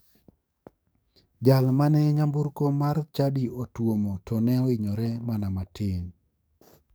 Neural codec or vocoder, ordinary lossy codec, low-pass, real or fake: codec, 44.1 kHz, 7.8 kbps, DAC; none; none; fake